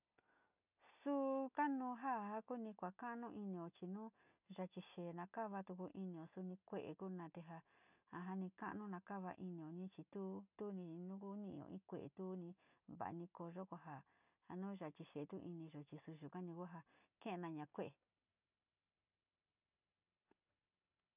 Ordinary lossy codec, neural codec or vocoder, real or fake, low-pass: none; none; real; 3.6 kHz